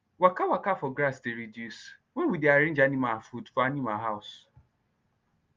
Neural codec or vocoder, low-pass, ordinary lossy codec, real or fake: none; 7.2 kHz; Opus, 24 kbps; real